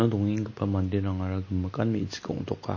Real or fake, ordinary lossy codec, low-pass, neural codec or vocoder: real; MP3, 32 kbps; 7.2 kHz; none